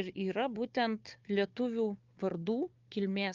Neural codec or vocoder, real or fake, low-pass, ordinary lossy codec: codec, 16 kHz, 4 kbps, FunCodec, trained on Chinese and English, 50 frames a second; fake; 7.2 kHz; Opus, 32 kbps